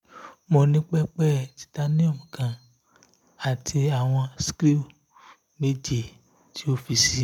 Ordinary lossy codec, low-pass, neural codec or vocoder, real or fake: MP3, 96 kbps; 19.8 kHz; none; real